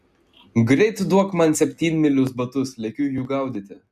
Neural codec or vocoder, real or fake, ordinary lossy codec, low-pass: none; real; MP3, 96 kbps; 14.4 kHz